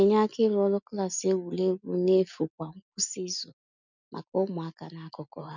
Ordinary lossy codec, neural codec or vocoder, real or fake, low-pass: none; none; real; 7.2 kHz